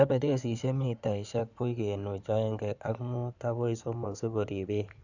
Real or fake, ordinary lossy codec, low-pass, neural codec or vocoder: fake; none; 7.2 kHz; codec, 16 kHz in and 24 kHz out, 2.2 kbps, FireRedTTS-2 codec